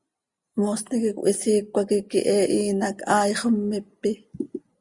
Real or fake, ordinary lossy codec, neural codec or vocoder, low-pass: real; Opus, 64 kbps; none; 10.8 kHz